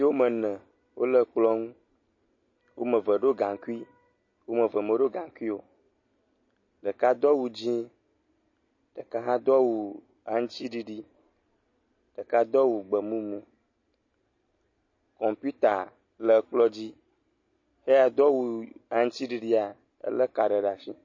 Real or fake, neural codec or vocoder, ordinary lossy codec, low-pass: real; none; MP3, 32 kbps; 7.2 kHz